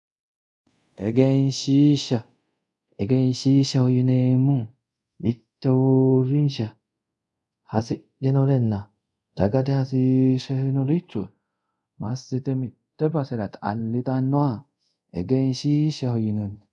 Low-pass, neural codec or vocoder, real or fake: 10.8 kHz; codec, 24 kHz, 0.5 kbps, DualCodec; fake